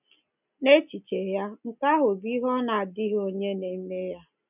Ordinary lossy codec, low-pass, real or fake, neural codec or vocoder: none; 3.6 kHz; real; none